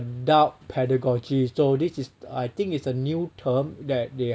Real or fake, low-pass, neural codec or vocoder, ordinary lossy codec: real; none; none; none